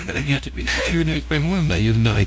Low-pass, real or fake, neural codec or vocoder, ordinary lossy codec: none; fake; codec, 16 kHz, 0.5 kbps, FunCodec, trained on LibriTTS, 25 frames a second; none